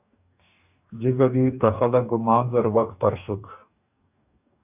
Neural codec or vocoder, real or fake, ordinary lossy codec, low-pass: codec, 44.1 kHz, 2.6 kbps, DAC; fake; AAC, 32 kbps; 3.6 kHz